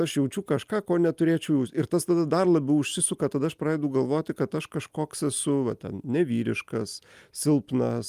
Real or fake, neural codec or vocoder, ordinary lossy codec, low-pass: real; none; Opus, 32 kbps; 14.4 kHz